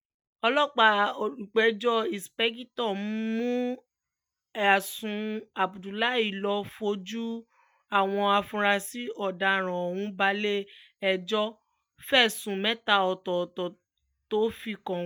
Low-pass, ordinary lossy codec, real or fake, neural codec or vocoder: none; none; real; none